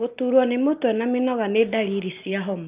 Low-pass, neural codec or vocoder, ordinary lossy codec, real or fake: 3.6 kHz; none; Opus, 24 kbps; real